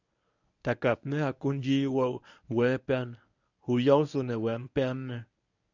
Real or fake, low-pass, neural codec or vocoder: fake; 7.2 kHz; codec, 24 kHz, 0.9 kbps, WavTokenizer, medium speech release version 1